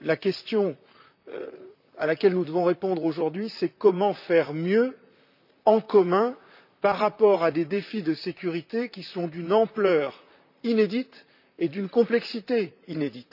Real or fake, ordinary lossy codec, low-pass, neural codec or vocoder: fake; none; 5.4 kHz; vocoder, 44.1 kHz, 128 mel bands, Pupu-Vocoder